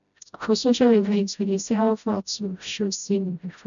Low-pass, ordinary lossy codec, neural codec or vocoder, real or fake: 7.2 kHz; none; codec, 16 kHz, 0.5 kbps, FreqCodec, smaller model; fake